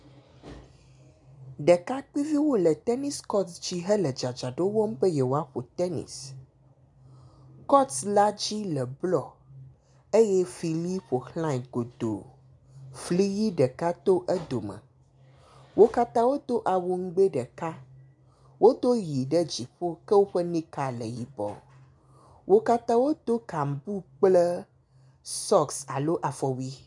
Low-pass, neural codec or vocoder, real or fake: 10.8 kHz; none; real